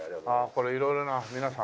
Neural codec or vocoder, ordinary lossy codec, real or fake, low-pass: none; none; real; none